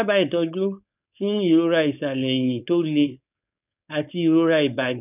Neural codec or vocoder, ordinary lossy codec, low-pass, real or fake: codec, 16 kHz, 4.8 kbps, FACodec; none; 3.6 kHz; fake